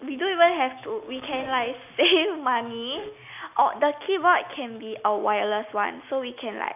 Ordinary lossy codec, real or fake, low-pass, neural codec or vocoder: none; real; 3.6 kHz; none